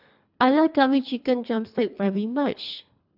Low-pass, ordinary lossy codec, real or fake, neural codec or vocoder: 5.4 kHz; AAC, 48 kbps; fake; codec, 24 kHz, 3 kbps, HILCodec